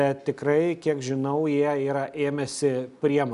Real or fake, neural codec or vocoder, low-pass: real; none; 10.8 kHz